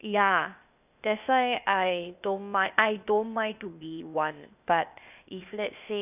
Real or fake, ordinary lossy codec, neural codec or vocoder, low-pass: fake; none; codec, 16 kHz, 0.8 kbps, ZipCodec; 3.6 kHz